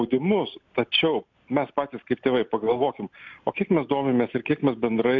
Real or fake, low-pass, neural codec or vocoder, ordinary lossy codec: real; 7.2 kHz; none; MP3, 64 kbps